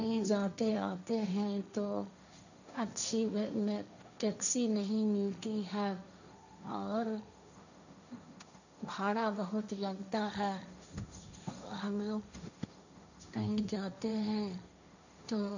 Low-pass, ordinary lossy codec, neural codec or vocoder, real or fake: 7.2 kHz; none; codec, 16 kHz, 1.1 kbps, Voila-Tokenizer; fake